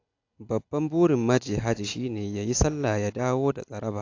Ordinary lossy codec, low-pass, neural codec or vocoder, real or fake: AAC, 48 kbps; 7.2 kHz; none; real